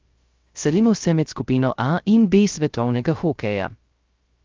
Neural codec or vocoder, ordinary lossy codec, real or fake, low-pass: codec, 16 kHz, 0.3 kbps, FocalCodec; Opus, 32 kbps; fake; 7.2 kHz